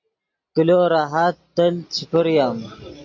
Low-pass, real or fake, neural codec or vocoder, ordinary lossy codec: 7.2 kHz; real; none; AAC, 48 kbps